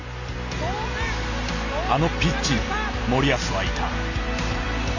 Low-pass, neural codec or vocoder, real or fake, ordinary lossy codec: 7.2 kHz; none; real; none